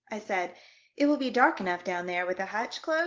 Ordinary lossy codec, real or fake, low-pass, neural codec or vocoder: Opus, 16 kbps; real; 7.2 kHz; none